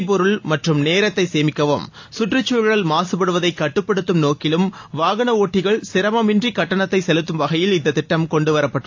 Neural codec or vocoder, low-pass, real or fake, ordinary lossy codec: none; 7.2 kHz; real; AAC, 48 kbps